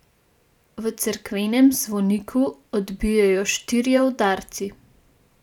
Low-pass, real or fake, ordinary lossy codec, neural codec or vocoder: 19.8 kHz; fake; none; vocoder, 44.1 kHz, 128 mel bands every 512 samples, BigVGAN v2